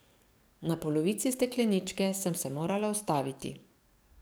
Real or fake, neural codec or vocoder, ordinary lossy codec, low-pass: fake; codec, 44.1 kHz, 7.8 kbps, DAC; none; none